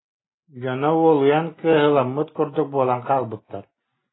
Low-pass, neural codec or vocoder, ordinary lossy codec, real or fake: 7.2 kHz; none; AAC, 16 kbps; real